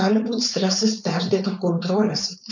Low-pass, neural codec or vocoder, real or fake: 7.2 kHz; codec, 16 kHz, 4.8 kbps, FACodec; fake